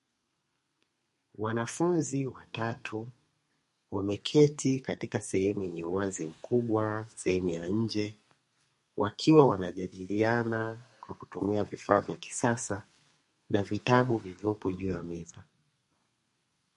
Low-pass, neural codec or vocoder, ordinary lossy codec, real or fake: 14.4 kHz; codec, 32 kHz, 1.9 kbps, SNAC; MP3, 48 kbps; fake